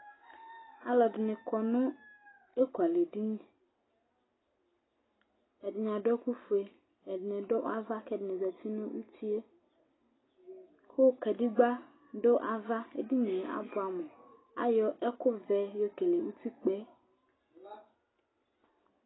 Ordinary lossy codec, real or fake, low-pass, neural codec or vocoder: AAC, 16 kbps; real; 7.2 kHz; none